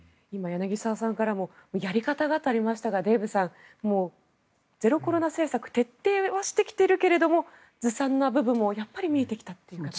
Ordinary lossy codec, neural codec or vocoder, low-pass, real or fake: none; none; none; real